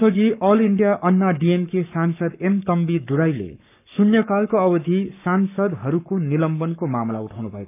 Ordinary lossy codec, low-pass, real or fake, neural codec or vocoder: none; 3.6 kHz; fake; codec, 44.1 kHz, 7.8 kbps, Pupu-Codec